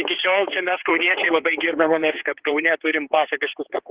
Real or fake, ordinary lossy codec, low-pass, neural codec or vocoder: fake; Opus, 16 kbps; 3.6 kHz; codec, 16 kHz, 4 kbps, X-Codec, HuBERT features, trained on general audio